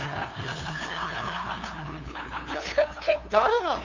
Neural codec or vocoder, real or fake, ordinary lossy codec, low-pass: codec, 16 kHz, 2 kbps, FunCodec, trained on LibriTTS, 25 frames a second; fake; MP3, 64 kbps; 7.2 kHz